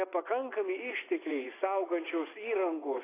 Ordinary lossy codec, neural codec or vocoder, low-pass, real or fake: AAC, 16 kbps; vocoder, 24 kHz, 100 mel bands, Vocos; 3.6 kHz; fake